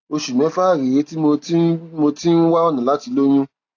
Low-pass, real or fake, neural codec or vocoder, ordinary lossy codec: 7.2 kHz; real; none; AAC, 48 kbps